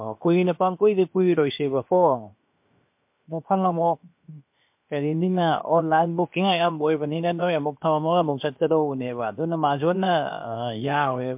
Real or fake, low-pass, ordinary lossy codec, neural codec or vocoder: fake; 3.6 kHz; MP3, 32 kbps; codec, 16 kHz, 0.7 kbps, FocalCodec